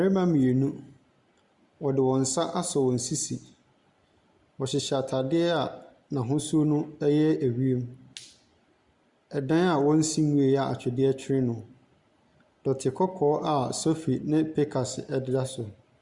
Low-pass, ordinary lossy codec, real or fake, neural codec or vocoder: 10.8 kHz; Opus, 64 kbps; real; none